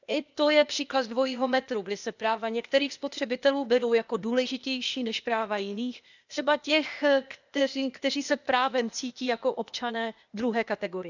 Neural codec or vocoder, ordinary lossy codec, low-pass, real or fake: codec, 16 kHz, 0.8 kbps, ZipCodec; none; 7.2 kHz; fake